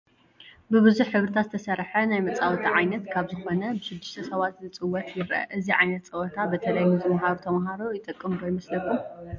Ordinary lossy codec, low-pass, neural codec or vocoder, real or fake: MP3, 48 kbps; 7.2 kHz; none; real